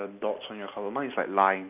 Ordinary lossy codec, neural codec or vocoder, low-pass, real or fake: none; none; 3.6 kHz; real